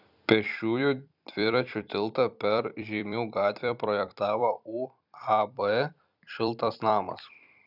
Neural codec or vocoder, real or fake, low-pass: vocoder, 44.1 kHz, 128 mel bands every 256 samples, BigVGAN v2; fake; 5.4 kHz